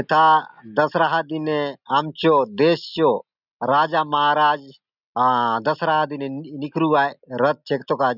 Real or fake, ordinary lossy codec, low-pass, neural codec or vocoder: real; none; 5.4 kHz; none